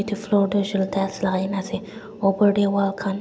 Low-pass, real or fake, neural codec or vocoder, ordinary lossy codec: none; real; none; none